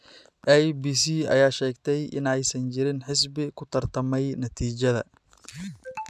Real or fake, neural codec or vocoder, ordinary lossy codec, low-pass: real; none; none; none